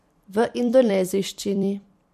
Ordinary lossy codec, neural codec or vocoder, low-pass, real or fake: MP3, 64 kbps; vocoder, 44.1 kHz, 128 mel bands every 256 samples, BigVGAN v2; 14.4 kHz; fake